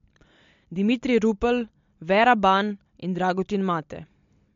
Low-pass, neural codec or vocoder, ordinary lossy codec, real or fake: 7.2 kHz; none; MP3, 48 kbps; real